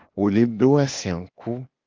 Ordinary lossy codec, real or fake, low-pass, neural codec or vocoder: Opus, 32 kbps; fake; 7.2 kHz; codec, 16 kHz, 0.8 kbps, ZipCodec